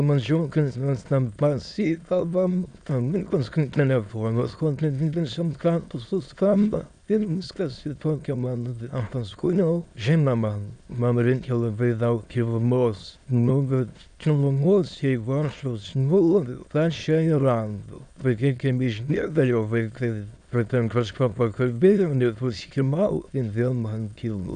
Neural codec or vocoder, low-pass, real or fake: autoencoder, 22.05 kHz, a latent of 192 numbers a frame, VITS, trained on many speakers; 9.9 kHz; fake